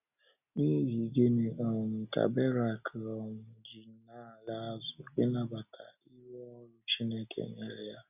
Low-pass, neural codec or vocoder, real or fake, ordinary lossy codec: 3.6 kHz; none; real; none